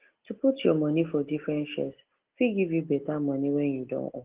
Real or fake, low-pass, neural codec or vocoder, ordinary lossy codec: real; 3.6 kHz; none; Opus, 16 kbps